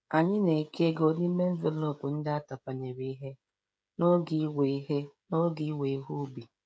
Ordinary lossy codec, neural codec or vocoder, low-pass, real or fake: none; codec, 16 kHz, 16 kbps, FreqCodec, smaller model; none; fake